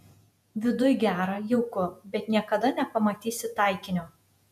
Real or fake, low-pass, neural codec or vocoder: fake; 14.4 kHz; vocoder, 48 kHz, 128 mel bands, Vocos